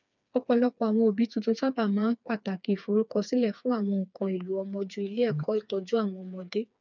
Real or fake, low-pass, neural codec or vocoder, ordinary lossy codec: fake; 7.2 kHz; codec, 16 kHz, 4 kbps, FreqCodec, smaller model; none